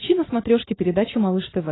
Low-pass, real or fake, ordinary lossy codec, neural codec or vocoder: 7.2 kHz; real; AAC, 16 kbps; none